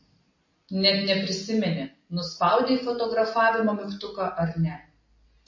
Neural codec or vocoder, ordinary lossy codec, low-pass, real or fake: none; MP3, 32 kbps; 7.2 kHz; real